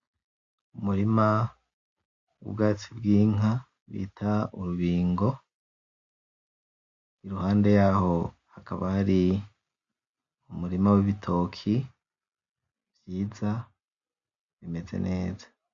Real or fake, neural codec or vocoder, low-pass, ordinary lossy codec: real; none; 7.2 kHz; MP3, 48 kbps